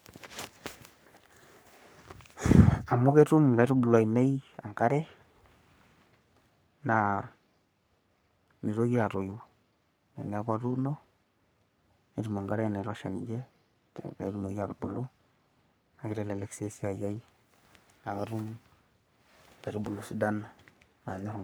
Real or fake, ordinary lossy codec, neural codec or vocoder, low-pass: fake; none; codec, 44.1 kHz, 3.4 kbps, Pupu-Codec; none